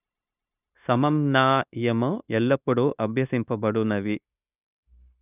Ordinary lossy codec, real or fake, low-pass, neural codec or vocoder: none; fake; 3.6 kHz; codec, 16 kHz, 0.9 kbps, LongCat-Audio-Codec